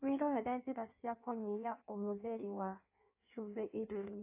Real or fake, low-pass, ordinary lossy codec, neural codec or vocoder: fake; 3.6 kHz; AAC, 24 kbps; codec, 16 kHz in and 24 kHz out, 1.1 kbps, FireRedTTS-2 codec